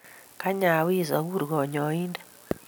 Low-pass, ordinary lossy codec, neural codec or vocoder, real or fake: none; none; none; real